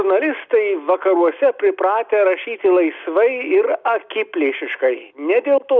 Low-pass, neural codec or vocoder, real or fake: 7.2 kHz; none; real